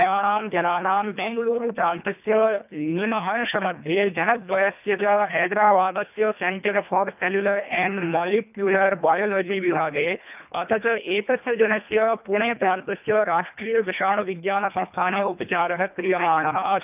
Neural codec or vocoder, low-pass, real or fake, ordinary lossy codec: codec, 24 kHz, 1.5 kbps, HILCodec; 3.6 kHz; fake; none